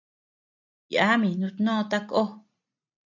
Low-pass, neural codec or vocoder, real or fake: 7.2 kHz; none; real